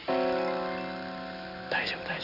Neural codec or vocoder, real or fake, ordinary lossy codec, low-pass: none; real; none; 5.4 kHz